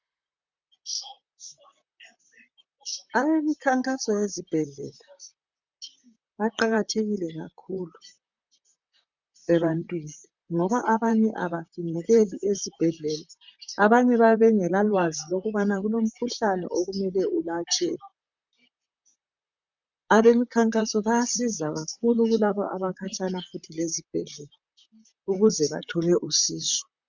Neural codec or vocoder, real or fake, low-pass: vocoder, 44.1 kHz, 128 mel bands, Pupu-Vocoder; fake; 7.2 kHz